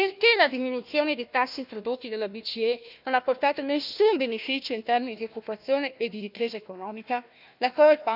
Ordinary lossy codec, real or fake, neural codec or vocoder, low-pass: none; fake; codec, 16 kHz, 1 kbps, FunCodec, trained on Chinese and English, 50 frames a second; 5.4 kHz